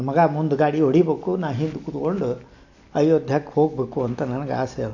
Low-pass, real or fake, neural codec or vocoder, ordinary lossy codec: 7.2 kHz; real; none; none